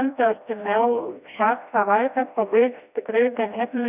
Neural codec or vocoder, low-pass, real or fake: codec, 16 kHz, 1 kbps, FreqCodec, smaller model; 3.6 kHz; fake